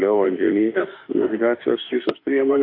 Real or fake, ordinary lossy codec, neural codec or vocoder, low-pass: fake; AAC, 32 kbps; autoencoder, 48 kHz, 32 numbers a frame, DAC-VAE, trained on Japanese speech; 5.4 kHz